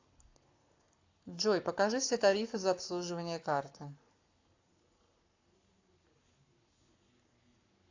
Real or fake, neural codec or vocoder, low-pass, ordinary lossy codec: fake; codec, 44.1 kHz, 7.8 kbps, Pupu-Codec; 7.2 kHz; AAC, 48 kbps